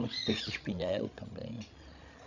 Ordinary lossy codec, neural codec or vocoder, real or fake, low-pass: none; codec, 16 kHz, 16 kbps, FreqCodec, larger model; fake; 7.2 kHz